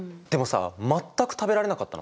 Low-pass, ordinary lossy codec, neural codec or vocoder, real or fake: none; none; none; real